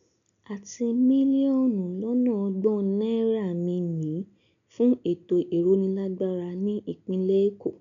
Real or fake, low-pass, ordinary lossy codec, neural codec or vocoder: real; 7.2 kHz; none; none